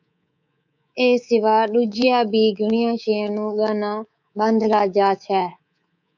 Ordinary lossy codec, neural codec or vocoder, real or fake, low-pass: MP3, 64 kbps; codec, 24 kHz, 3.1 kbps, DualCodec; fake; 7.2 kHz